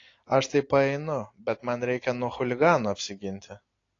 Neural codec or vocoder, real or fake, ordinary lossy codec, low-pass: none; real; AAC, 32 kbps; 7.2 kHz